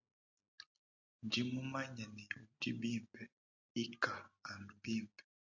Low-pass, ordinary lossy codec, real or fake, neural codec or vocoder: 7.2 kHz; AAC, 48 kbps; real; none